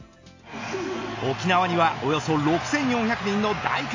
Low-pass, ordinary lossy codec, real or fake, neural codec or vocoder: 7.2 kHz; AAC, 48 kbps; real; none